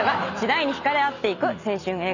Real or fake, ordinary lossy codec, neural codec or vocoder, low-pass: real; none; none; 7.2 kHz